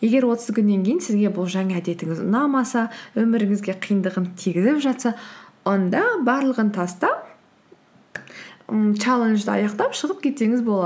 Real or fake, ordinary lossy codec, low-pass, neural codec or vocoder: real; none; none; none